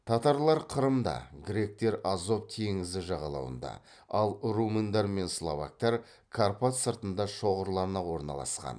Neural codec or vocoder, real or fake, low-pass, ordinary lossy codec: none; real; 9.9 kHz; none